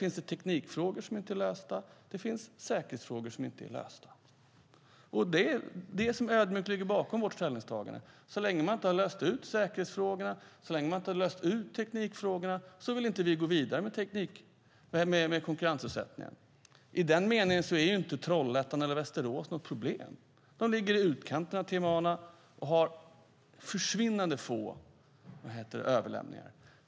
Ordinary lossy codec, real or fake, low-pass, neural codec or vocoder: none; real; none; none